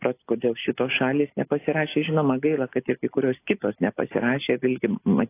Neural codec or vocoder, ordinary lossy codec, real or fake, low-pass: none; AAC, 24 kbps; real; 3.6 kHz